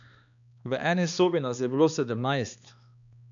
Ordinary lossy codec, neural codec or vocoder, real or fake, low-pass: none; codec, 16 kHz, 2 kbps, X-Codec, HuBERT features, trained on balanced general audio; fake; 7.2 kHz